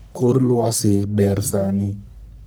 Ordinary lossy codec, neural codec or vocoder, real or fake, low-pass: none; codec, 44.1 kHz, 1.7 kbps, Pupu-Codec; fake; none